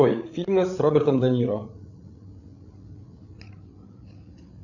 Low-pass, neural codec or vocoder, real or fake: 7.2 kHz; codec, 16 kHz, 8 kbps, FreqCodec, larger model; fake